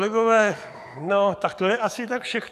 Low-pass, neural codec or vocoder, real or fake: 14.4 kHz; codec, 44.1 kHz, 7.8 kbps, DAC; fake